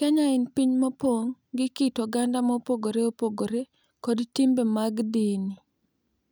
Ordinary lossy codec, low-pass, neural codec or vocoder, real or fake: none; none; none; real